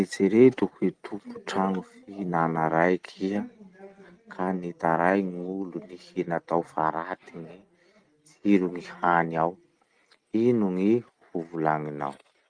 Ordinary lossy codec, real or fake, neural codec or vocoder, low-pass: Opus, 24 kbps; real; none; 9.9 kHz